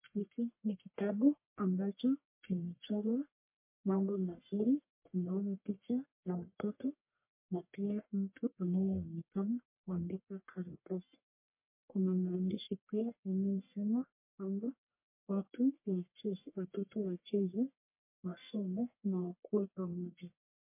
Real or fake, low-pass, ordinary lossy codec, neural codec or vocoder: fake; 3.6 kHz; MP3, 24 kbps; codec, 44.1 kHz, 1.7 kbps, Pupu-Codec